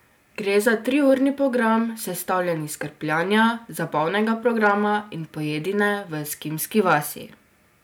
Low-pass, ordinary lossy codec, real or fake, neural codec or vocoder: none; none; real; none